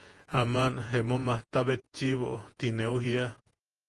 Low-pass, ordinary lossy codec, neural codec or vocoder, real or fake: 10.8 kHz; Opus, 24 kbps; vocoder, 48 kHz, 128 mel bands, Vocos; fake